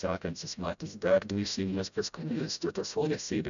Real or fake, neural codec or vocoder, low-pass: fake; codec, 16 kHz, 0.5 kbps, FreqCodec, smaller model; 7.2 kHz